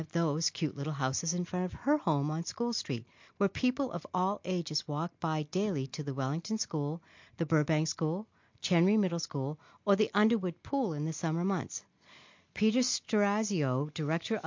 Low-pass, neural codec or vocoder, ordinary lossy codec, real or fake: 7.2 kHz; none; MP3, 48 kbps; real